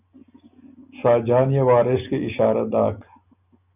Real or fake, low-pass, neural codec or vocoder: real; 3.6 kHz; none